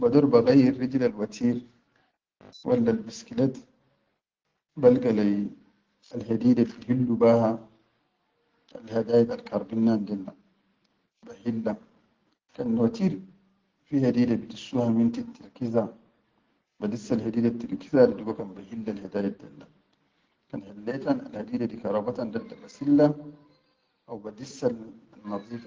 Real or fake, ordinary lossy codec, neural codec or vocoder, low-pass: real; Opus, 16 kbps; none; 7.2 kHz